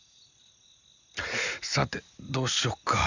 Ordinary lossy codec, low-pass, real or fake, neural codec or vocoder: none; 7.2 kHz; real; none